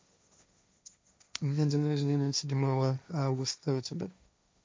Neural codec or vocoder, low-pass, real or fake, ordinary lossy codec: codec, 16 kHz, 1.1 kbps, Voila-Tokenizer; none; fake; none